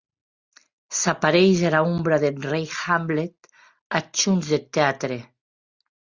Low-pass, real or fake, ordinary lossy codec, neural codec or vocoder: 7.2 kHz; real; Opus, 64 kbps; none